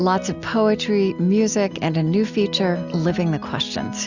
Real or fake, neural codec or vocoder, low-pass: real; none; 7.2 kHz